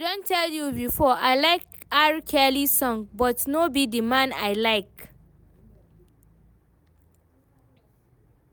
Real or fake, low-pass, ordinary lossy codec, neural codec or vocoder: real; none; none; none